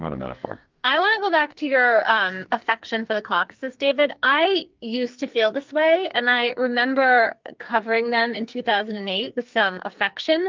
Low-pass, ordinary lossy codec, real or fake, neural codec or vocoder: 7.2 kHz; Opus, 24 kbps; fake; codec, 44.1 kHz, 2.6 kbps, SNAC